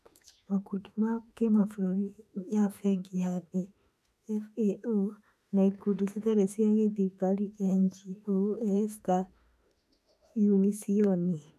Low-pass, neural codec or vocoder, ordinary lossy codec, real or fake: 14.4 kHz; autoencoder, 48 kHz, 32 numbers a frame, DAC-VAE, trained on Japanese speech; none; fake